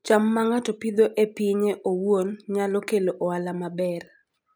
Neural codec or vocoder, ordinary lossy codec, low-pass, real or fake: none; none; none; real